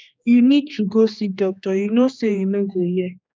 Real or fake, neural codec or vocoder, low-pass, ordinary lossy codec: fake; codec, 16 kHz, 2 kbps, X-Codec, HuBERT features, trained on general audio; none; none